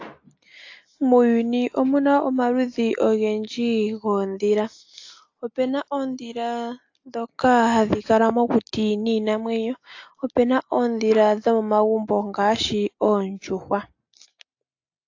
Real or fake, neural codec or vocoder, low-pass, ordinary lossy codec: real; none; 7.2 kHz; AAC, 48 kbps